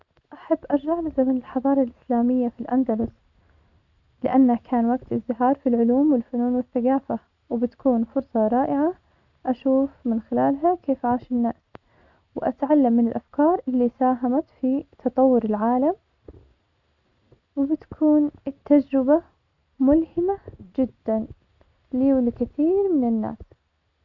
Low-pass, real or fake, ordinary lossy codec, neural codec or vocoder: 7.2 kHz; real; none; none